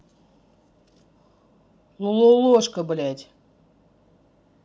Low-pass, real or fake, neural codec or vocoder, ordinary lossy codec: none; real; none; none